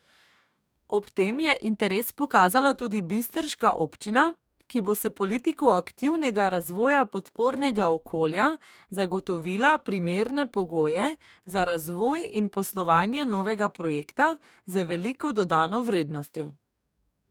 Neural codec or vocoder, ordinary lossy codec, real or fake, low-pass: codec, 44.1 kHz, 2.6 kbps, DAC; none; fake; none